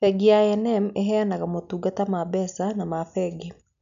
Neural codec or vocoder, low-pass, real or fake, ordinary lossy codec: none; 7.2 kHz; real; none